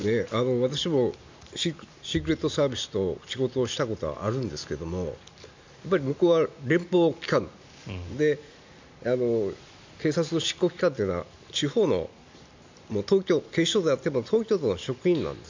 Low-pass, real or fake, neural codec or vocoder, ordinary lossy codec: 7.2 kHz; real; none; MP3, 64 kbps